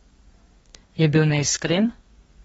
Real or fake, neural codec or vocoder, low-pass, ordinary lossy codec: fake; codec, 32 kHz, 1.9 kbps, SNAC; 14.4 kHz; AAC, 24 kbps